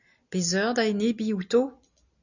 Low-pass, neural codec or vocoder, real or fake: 7.2 kHz; none; real